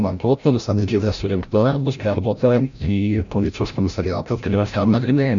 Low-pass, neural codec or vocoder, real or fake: 7.2 kHz; codec, 16 kHz, 0.5 kbps, FreqCodec, larger model; fake